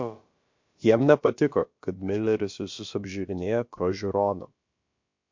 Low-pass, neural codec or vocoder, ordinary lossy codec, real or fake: 7.2 kHz; codec, 16 kHz, about 1 kbps, DyCAST, with the encoder's durations; MP3, 48 kbps; fake